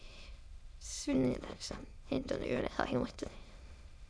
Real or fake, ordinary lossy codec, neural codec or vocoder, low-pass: fake; none; autoencoder, 22.05 kHz, a latent of 192 numbers a frame, VITS, trained on many speakers; none